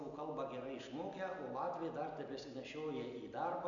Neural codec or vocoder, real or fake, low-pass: none; real; 7.2 kHz